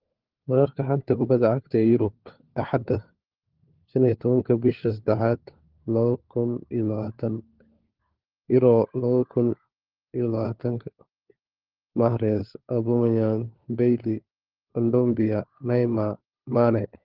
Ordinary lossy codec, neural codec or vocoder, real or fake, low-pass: Opus, 16 kbps; codec, 16 kHz, 4 kbps, FunCodec, trained on LibriTTS, 50 frames a second; fake; 5.4 kHz